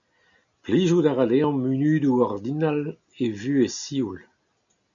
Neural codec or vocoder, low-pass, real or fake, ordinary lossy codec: none; 7.2 kHz; real; MP3, 96 kbps